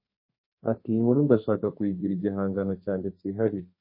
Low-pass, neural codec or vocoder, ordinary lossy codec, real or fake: 5.4 kHz; codec, 32 kHz, 1.9 kbps, SNAC; MP3, 24 kbps; fake